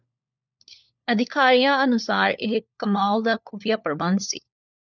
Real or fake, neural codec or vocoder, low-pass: fake; codec, 16 kHz, 4 kbps, FunCodec, trained on LibriTTS, 50 frames a second; 7.2 kHz